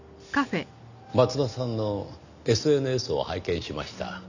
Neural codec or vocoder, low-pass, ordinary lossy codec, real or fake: none; 7.2 kHz; none; real